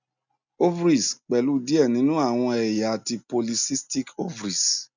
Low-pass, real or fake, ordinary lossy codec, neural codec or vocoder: 7.2 kHz; real; none; none